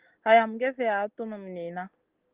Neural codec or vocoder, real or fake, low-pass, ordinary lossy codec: none; real; 3.6 kHz; Opus, 16 kbps